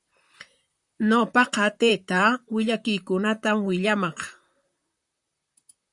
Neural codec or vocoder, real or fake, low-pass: vocoder, 44.1 kHz, 128 mel bands, Pupu-Vocoder; fake; 10.8 kHz